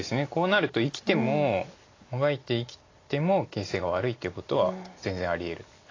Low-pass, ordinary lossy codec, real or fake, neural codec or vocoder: 7.2 kHz; AAC, 32 kbps; real; none